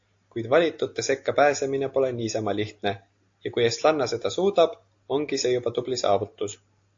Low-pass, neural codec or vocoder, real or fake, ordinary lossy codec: 7.2 kHz; none; real; AAC, 48 kbps